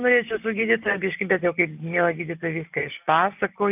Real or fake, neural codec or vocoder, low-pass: real; none; 3.6 kHz